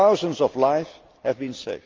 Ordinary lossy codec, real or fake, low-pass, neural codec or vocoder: Opus, 32 kbps; real; 7.2 kHz; none